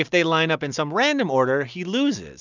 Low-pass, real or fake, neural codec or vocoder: 7.2 kHz; real; none